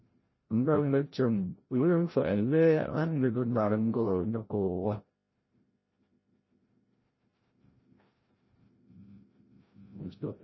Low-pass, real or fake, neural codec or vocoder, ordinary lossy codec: 7.2 kHz; fake; codec, 16 kHz, 0.5 kbps, FreqCodec, larger model; MP3, 24 kbps